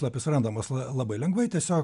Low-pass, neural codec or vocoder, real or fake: 10.8 kHz; none; real